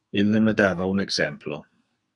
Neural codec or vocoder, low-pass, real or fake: codec, 44.1 kHz, 2.6 kbps, SNAC; 10.8 kHz; fake